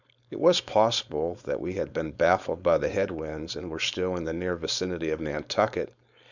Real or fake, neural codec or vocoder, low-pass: fake; codec, 16 kHz, 4.8 kbps, FACodec; 7.2 kHz